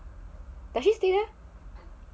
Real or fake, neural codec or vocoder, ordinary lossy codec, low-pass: real; none; none; none